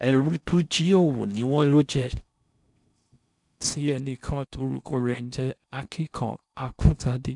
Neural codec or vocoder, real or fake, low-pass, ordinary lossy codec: codec, 16 kHz in and 24 kHz out, 0.6 kbps, FocalCodec, streaming, 4096 codes; fake; 10.8 kHz; none